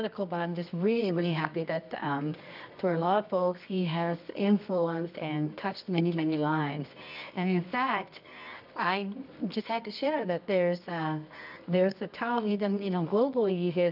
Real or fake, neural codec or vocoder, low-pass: fake; codec, 24 kHz, 0.9 kbps, WavTokenizer, medium music audio release; 5.4 kHz